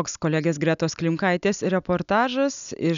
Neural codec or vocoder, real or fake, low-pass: none; real; 7.2 kHz